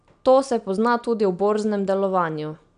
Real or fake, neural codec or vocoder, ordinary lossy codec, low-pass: real; none; none; 9.9 kHz